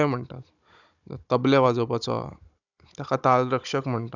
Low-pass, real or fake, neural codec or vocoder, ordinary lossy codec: 7.2 kHz; fake; codec, 16 kHz, 16 kbps, FunCodec, trained on Chinese and English, 50 frames a second; none